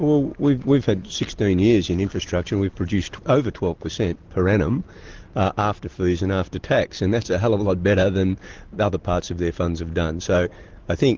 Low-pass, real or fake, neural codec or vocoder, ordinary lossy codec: 7.2 kHz; real; none; Opus, 16 kbps